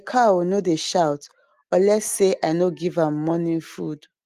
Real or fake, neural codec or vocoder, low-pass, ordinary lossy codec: real; none; 14.4 kHz; Opus, 24 kbps